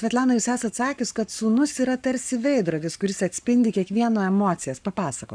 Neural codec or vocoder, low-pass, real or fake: codec, 44.1 kHz, 7.8 kbps, Pupu-Codec; 9.9 kHz; fake